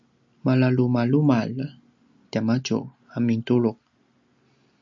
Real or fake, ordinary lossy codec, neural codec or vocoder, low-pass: real; MP3, 64 kbps; none; 7.2 kHz